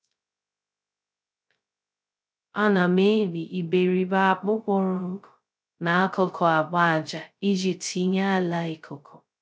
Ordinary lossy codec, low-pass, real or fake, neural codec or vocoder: none; none; fake; codec, 16 kHz, 0.2 kbps, FocalCodec